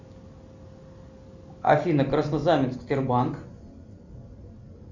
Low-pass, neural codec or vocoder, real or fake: 7.2 kHz; none; real